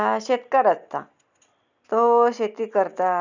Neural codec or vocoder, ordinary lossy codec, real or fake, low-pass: none; none; real; 7.2 kHz